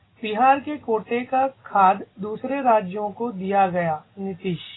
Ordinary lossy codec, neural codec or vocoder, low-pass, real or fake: AAC, 16 kbps; none; 7.2 kHz; real